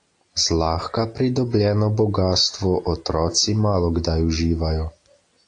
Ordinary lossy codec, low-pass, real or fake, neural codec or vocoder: AAC, 48 kbps; 9.9 kHz; real; none